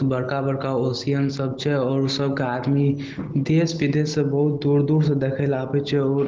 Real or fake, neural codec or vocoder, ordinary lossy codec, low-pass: real; none; Opus, 16 kbps; 7.2 kHz